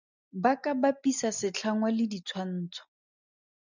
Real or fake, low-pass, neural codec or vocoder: real; 7.2 kHz; none